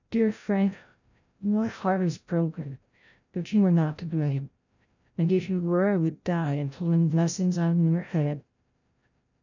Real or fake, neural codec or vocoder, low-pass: fake; codec, 16 kHz, 0.5 kbps, FreqCodec, larger model; 7.2 kHz